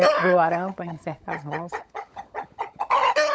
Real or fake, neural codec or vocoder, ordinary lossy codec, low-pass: fake; codec, 16 kHz, 16 kbps, FunCodec, trained on LibriTTS, 50 frames a second; none; none